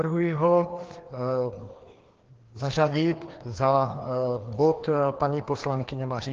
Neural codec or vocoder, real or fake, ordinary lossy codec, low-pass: codec, 16 kHz, 2 kbps, FreqCodec, larger model; fake; Opus, 16 kbps; 7.2 kHz